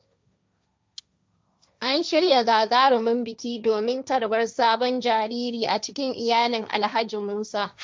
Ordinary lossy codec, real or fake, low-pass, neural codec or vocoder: none; fake; none; codec, 16 kHz, 1.1 kbps, Voila-Tokenizer